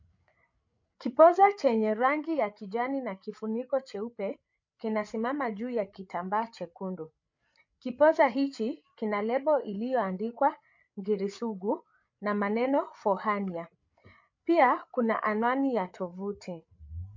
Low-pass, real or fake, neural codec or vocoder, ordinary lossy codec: 7.2 kHz; fake; codec, 16 kHz, 8 kbps, FreqCodec, larger model; MP3, 48 kbps